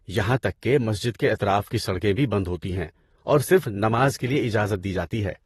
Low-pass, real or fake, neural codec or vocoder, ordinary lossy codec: 19.8 kHz; fake; vocoder, 44.1 kHz, 128 mel bands, Pupu-Vocoder; AAC, 32 kbps